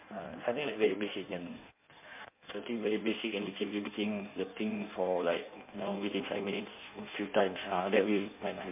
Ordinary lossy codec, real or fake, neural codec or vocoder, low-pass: MP3, 32 kbps; fake; codec, 16 kHz in and 24 kHz out, 1.1 kbps, FireRedTTS-2 codec; 3.6 kHz